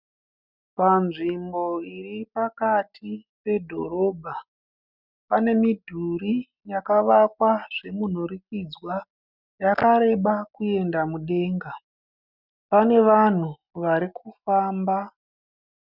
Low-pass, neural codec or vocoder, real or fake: 5.4 kHz; none; real